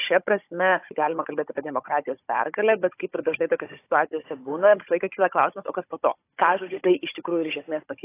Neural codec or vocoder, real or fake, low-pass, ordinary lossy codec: codec, 16 kHz, 16 kbps, FunCodec, trained on Chinese and English, 50 frames a second; fake; 3.6 kHz; AAC, 24 kbps